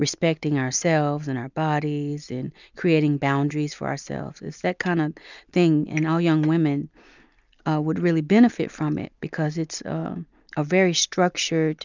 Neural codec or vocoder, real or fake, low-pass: none; real; 7.2 kHz